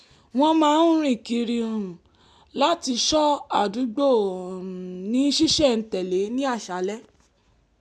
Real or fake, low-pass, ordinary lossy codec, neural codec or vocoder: real; none; none; none